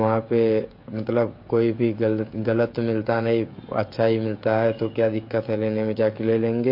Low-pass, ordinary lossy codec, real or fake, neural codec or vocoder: 5.4 kHz; MP3, 32 kbps; fake; codec, 16 kHz, 16 kbps, FreqCodec, smaller model